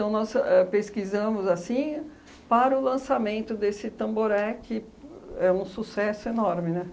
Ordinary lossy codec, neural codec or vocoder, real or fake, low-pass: none; none; real; none